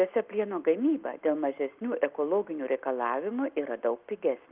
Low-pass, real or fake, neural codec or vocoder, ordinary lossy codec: 3.6 kHz; real; none; Opus, 32 kbps